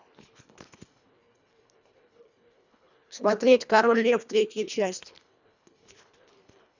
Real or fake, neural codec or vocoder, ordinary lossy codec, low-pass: fake; codec, 24 kHz, 1.5 kbps, HILCodec; none; 7.2 kHz